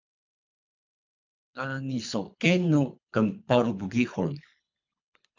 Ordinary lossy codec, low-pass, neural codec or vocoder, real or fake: MP3, 64 kbps; 7.2 kHz; codec, 24 kHz, 3 kbps, HILCodec; fake